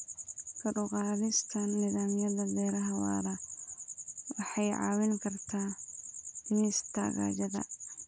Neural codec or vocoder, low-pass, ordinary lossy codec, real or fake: none; none; none; real